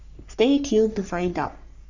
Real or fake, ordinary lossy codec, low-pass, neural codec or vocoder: fake; none; 7.2 kHz; codec, 44.1 kHz, 3.4 kbps, Pupu-Codec